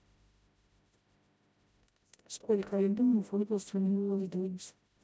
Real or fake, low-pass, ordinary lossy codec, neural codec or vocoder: fake; none; none; codec, 16 kHz, 0.5 kbps, FreqCodec, smaller model